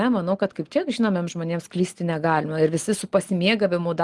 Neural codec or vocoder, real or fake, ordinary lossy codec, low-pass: none; real; Opus, 24 kbps; 10.8 kHz